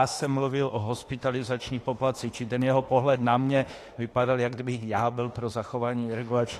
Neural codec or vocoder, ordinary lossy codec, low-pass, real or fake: autoencoder, 48 kHz, 32 numbers a frame, DAC-VAE, trained on Japanese speech; AAC, 48 kbps; 14.4 kHz; fake